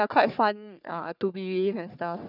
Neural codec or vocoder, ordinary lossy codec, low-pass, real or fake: codec, 44.1 kHz, 3.4 kbps, Pupu-Codec; none; 5.4 kHz; fake